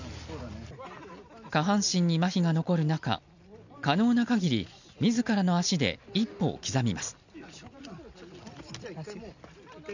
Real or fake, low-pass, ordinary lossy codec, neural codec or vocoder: real; 7.2 kHz; none; none